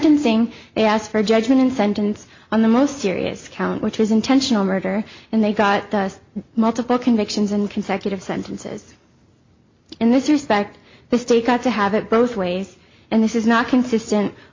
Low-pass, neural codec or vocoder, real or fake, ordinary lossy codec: 7.2 kHz; none; real; MP3, 48 kbps